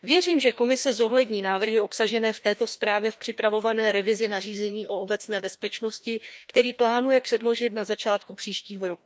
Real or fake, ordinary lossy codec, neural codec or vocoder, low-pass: fake; none; codec, 16 kHz, 1 kbps, FreqCodec, larger model; none